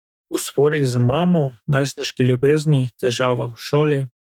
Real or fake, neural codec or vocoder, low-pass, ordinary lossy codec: fake; codec, 44.1 kHz, 2.6 kbps, DAC; 19.8 kHz; none